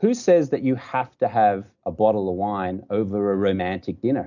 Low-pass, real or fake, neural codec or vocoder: 7.2 kHz; real; none